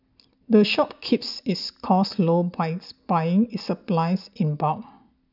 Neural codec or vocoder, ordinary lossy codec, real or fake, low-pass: none; none; real; 5.4 kHz